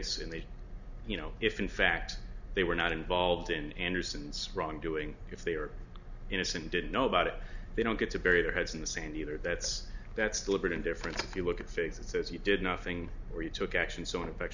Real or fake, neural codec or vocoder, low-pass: real; none; 7.2 kHz